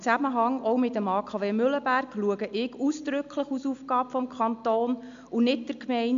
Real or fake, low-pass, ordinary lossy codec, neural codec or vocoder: real; 7.2 kHz; AAC, 64 kbps; none